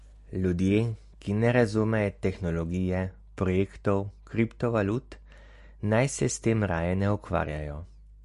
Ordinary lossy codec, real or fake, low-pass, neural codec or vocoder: MP3, 48 kbps; real; 14.4 kHz; none